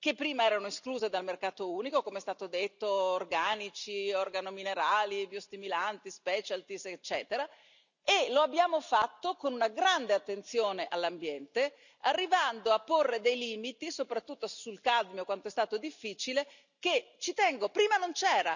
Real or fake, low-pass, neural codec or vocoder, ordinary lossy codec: real; 7.2 kHz; none; none